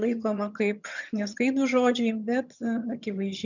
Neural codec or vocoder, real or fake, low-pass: vocoder, 22.05 kHz, 80 mel bands, HiFi-GAN; fake; 7.2 kHz